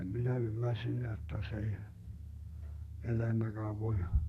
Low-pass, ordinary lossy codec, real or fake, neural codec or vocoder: 14.4 kHz; none; fake; codec, 32 kHz, 1.9 kbps, SNAC